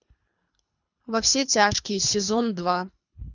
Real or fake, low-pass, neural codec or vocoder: fake; 7.2 kHz; codec, 24 kHz, 3 kbps, HILCodec